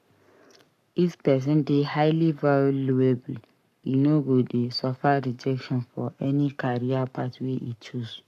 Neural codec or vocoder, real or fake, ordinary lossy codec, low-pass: codec, 44.1 kHz, 7.8 kbps, Pupu-Codec; fake; none; 14.4 kHz